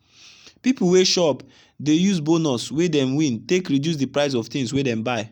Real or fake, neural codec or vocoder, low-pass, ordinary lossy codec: real; none; 19.8 kHz; none